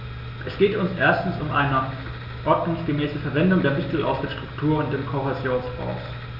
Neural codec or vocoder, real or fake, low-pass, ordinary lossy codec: none; real; 5.4 kHz; none